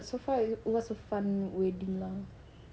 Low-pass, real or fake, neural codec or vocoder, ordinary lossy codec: none; real; none; none